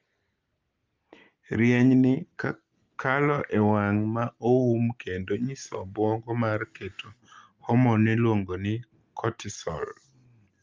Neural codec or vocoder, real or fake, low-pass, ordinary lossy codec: none; real; 7.2 kHz; Opus, 24 kbps